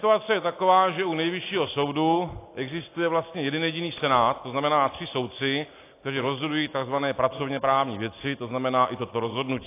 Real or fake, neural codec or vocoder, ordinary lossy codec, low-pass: real; none; AAC, 24 kbps; 3.6 kHz